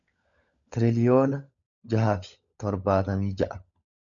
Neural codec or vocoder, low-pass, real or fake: codec, 16 kHz, 16 kbps, FunCodec, trained on LibriTTS, 50 frames a second; 7.2 kHz; fake